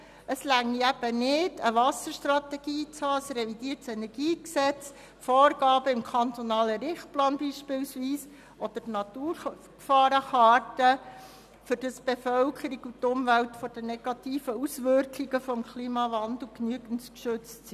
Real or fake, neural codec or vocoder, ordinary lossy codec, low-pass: real; none; none; 14.4 kHz